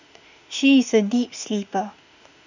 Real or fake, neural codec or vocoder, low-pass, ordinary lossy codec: fake; autoencoder, 48 kHz, 32 numbers a frame, DAC-VAE, trained on Japanese speech; 7.2 kHz; none